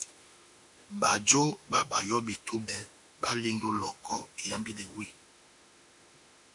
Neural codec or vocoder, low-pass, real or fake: autoencoder, 48 kHz, 32 numbers a frame, DAC-VAE, trained on Japanese speech; 10.8 kHz; fake